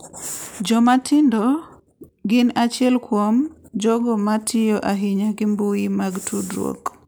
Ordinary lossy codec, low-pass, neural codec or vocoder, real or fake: none; none; none; real